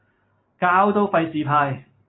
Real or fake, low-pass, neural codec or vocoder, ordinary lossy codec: real; 7.2 kHz; none; AAC, 16 kbps